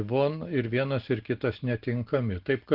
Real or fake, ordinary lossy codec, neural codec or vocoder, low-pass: real; Opus, 16 kbps; none; 5.4 kHz